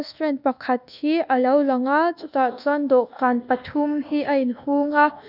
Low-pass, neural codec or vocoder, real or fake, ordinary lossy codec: 5.4 kHz; codec, 24 kHz, 1.2 kbps, DualCodec; fake; none